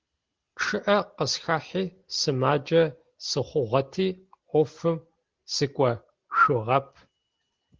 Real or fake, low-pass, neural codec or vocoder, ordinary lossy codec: real; 7.2 kHz; none; Opus, 16 kbps